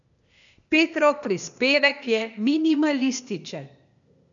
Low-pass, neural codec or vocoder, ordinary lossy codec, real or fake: 7.2 kHz; codec, 16 kHz, 0.8 kbps, ZipCodec; none; fake